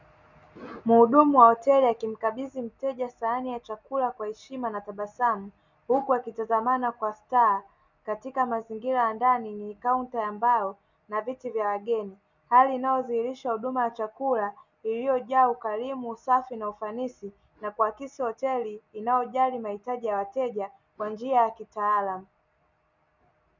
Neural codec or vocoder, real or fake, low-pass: none; real; 7.2 kHz